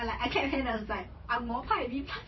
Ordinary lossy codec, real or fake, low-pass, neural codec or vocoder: MP3, 24 kbps; fake; 7.2 kHz; vocoder, 44.1 kHz, 128 mel bands every 512 samples, BigVGAN v2